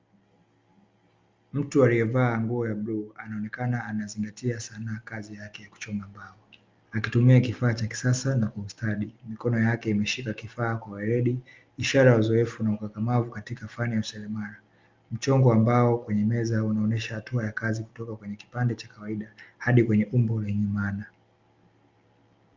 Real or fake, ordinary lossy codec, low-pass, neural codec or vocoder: real; Opus, 32 kbps; 7.2 kHz; none